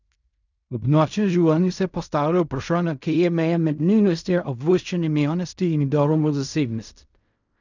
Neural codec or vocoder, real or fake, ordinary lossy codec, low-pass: codec, 16 kHz in and 24 kHz out, 0.4 kbps, LongCat-Audio-Codec, fine tuned four codebook decoder; fake; none; 7.2 kHz